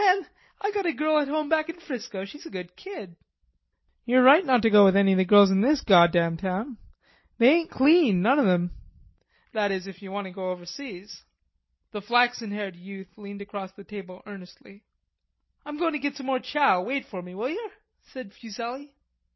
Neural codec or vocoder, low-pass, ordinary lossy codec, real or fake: none; 7.2 kHz; MP3, 24 kbps; real